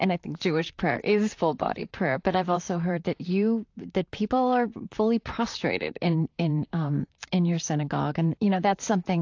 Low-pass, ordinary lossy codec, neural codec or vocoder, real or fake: 7.2 kHz; AAC, 48 kbps; vocoder, 44.1 kHz, 128 mel bands, Pupu-Vocoder; fake